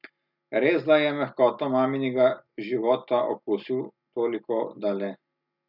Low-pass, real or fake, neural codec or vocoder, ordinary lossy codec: 5.4 kHz; real; none; none